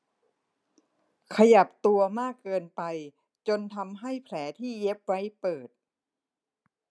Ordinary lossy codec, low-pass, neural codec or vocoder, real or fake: none; none; none; real